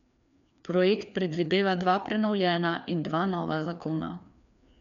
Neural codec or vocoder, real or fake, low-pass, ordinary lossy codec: codec, 16 kHz, 2 kbps, FreqCodec, larger model; fake; 7.2 kHz; none